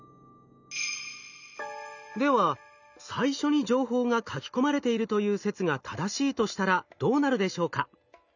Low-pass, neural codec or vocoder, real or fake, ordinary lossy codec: 7.2 kHz; none; real; none